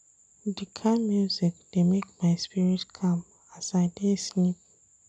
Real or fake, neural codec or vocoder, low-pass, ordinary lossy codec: real; none; none; none